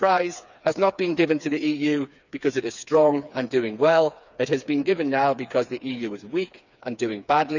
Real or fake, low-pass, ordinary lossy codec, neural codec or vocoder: fake; 7.2 kHz; none; codec, 16 kHz, 4 kbps, FreqCodec, smaller model